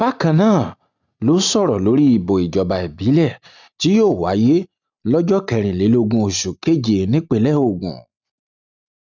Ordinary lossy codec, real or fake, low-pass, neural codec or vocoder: none; real; 7.2 kHz; none